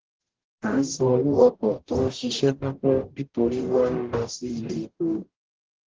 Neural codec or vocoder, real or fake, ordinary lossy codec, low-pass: codec, 44.1 kHz, 0.9 kbps, DAC; fake; Opus, 16 kbps; 7.2 kHz